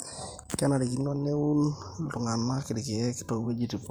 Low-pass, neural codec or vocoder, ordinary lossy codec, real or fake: 19.8 kHz; vocoder, 44.1 kHz, 128 mel bands every 512 samples, BigVGAN v2; none; fake